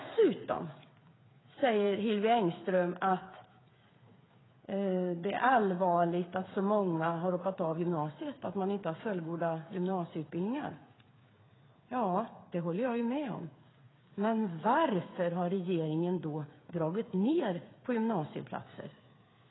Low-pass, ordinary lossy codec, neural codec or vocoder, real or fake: 7.2 kHz; AAC, 16 kbps; codec, 16 kHz, 8 kbps, FreqCodec, smaller model; fake